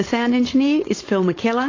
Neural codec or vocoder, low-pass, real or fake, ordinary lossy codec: codec, 16 kHz, 4.8 kbps, FACodec; 7.2 kHz; fake; AAC, 32 kbps